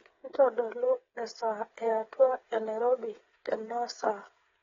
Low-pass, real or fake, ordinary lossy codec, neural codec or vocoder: 7.2 kHz; fake; AAC, 24 kbps; codec, 16 kHz, 8 kbps, FreqCodec, smaller model